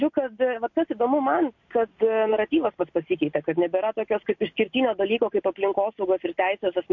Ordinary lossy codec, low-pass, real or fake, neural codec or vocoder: MP3, 48 kbps; 7.2 kHz; real; none